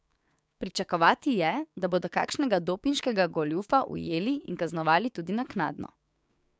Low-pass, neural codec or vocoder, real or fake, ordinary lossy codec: none; codec, 16 kHz, 6 kbps, DAC; fake; none